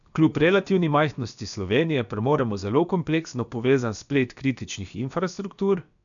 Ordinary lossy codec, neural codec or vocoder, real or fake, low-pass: none; codec, 16 kHz, about 1 kbps, DyCAST, with the encoder's durations; fake; 7.2 kHz